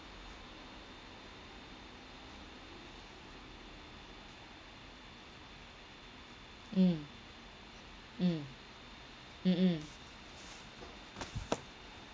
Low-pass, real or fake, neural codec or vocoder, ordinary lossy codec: none; real; none; none